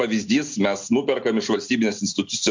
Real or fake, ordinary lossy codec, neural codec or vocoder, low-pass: real; MP3, 64 kbps; none; 7.2 kHz